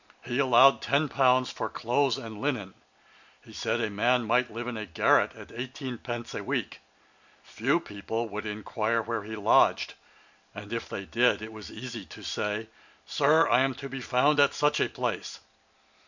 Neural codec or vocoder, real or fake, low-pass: none; real; 7.2 kHz